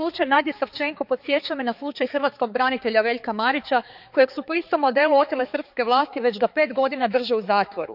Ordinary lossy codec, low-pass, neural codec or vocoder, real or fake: none; 5.4 kHz; codec, 16 kHz, 4 kbps, X-Codec, HuBERT features, trained on balanced general audio; fake